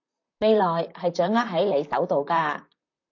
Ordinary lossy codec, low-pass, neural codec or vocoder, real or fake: AAC, 48 kbps; 7.2 kHz; vocoder, 44.1 kHz, 128 mel bands, Pupu-Vocoder; fake